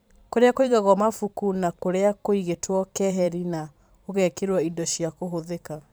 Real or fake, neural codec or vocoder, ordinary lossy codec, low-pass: fake; vocoder, 44.1 kHz, 128 mel bands every 512 samples, BigVGAN v2; none; none